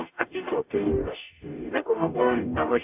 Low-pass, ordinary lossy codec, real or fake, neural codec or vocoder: 3.6 kHz; AAC, 32 kbps; fake; codec, 44.1 kHz, 0.9 kbps, DAC